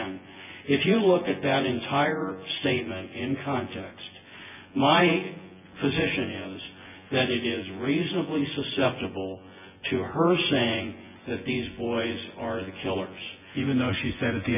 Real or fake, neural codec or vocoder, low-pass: fake; vocoder, 24 kHz, 100 mel bands, Vocos; 3.6 kHz